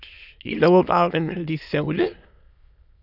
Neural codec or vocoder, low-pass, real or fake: autoencoder, 22.05 kHz, a latent of 192 numbers a frame, VITS, trained on many speakers; 5.4 kHz; fake